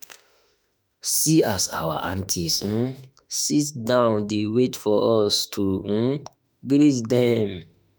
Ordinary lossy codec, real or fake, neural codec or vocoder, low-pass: none; fake; autoencoder, 48 kHz, 32 numbers a frame, DAC-VAE, trained on Japanese speech; none